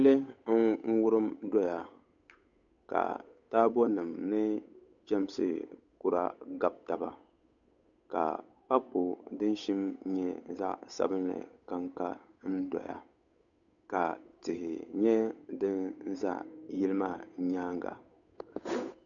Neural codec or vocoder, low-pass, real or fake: codec, 16 kHz, 8 kbps, FunCodec, trained on Chinese and English, 25 frames a second; 7.2 kHz; fake